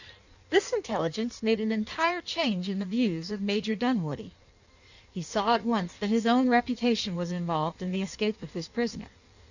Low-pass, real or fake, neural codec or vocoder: 7.2 kHz; fake; codec, 16 kHz in and 24 kHz out, 1.1 kbps, FireRedTTS-2 codec